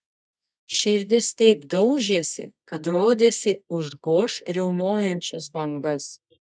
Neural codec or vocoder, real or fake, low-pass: codec, 24 kHz, 0.9 kbps, WavTokenizer, medium music audio release; fake; 9.9 kHz